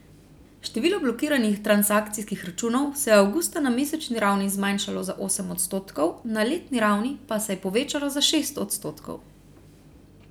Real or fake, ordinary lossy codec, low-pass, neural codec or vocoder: real; none; none; none